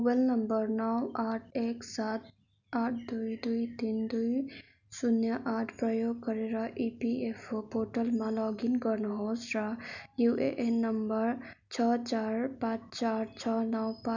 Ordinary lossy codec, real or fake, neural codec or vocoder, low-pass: none; real; none; 7.2 kHz